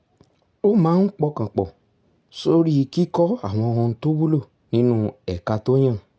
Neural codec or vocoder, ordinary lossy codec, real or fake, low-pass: none; none; real; none